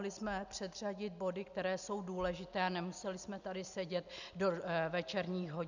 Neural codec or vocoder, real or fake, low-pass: none; real; 7.2 kHz